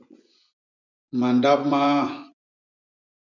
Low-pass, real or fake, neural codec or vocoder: 7.2 kHz; fake; vocoder, 24 kHz, 100 mel bands, Vocos